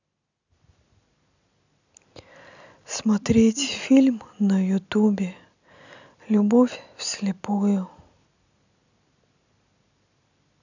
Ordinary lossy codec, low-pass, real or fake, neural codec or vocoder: none; 7.2 kHz; real; none